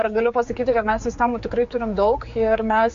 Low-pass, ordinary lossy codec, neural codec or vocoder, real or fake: 7.2 kHz; MP3, 48 kbps; codec, 16 kHz, 4 kbps, X-Codec, HuBERT features, trained on general audio; fake